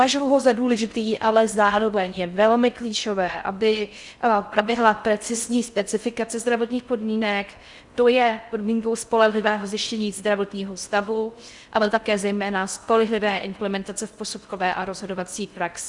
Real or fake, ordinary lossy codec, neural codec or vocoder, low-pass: fake; Opus, 64 kbps; codec, 16 kHz in and 24 kHz out, 0.6 kbps, FocalCodec, streaming, 4096 codes; 10.8 kHz